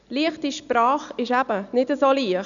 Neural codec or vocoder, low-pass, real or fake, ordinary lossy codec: none; 7.2 kHz; real; none